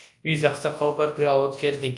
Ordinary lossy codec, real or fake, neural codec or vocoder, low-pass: AAC, 64 kbps; fake; codec, 24 kHz, 0.9 kbps, WavTokenizer, large speech release; 10.8 kHz